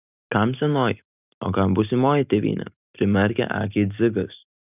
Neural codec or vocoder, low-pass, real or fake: none; 3.6 kHz; real